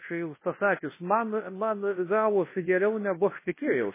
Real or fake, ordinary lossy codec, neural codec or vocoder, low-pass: fake; MP3, 16 kbps; codec, 24 kHz, 0.9 kbps, WavTokenizer, large speech release; 3.6 kHz